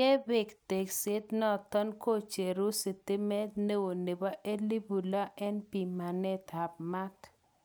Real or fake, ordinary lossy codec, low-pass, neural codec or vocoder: real; none; none; none